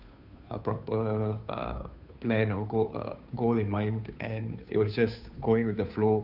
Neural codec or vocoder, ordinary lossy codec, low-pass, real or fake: codec, 16 kHz, 2 kbps, FunCodec, trained on Chinese and English, 25 frames a second; none; 5.4 kHz; fake